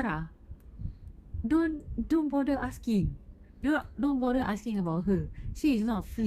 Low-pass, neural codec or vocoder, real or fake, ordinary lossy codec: 14.4 kHz; codec, 32 kHz, 1.9 kbps, SNAC; fake; Opus, 64 kbps